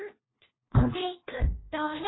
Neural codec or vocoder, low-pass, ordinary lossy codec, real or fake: codec, 16 kHz, 1 kbps, FunCodec, trained on LibriTTS, 50 frames a second; 7.2 kHz; AAC, 16 kbps; fake